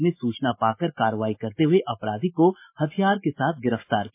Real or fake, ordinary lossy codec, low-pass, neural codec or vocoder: real; MP3, 24 kbps; 3.6 kHz; none